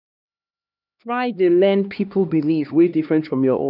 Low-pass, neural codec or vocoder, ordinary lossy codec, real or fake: 5.4 kHz; codec, 16 kHz, 2 kbps, X-Codec, HuBERT features, trained on LibriSpeech; none; fake